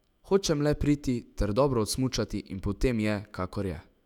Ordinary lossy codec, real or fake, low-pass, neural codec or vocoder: none; fake; 19.8 kHz; autoencoder, 48 kHz, 128 numbers a frame, DAC-VAE, trained on Japanese speech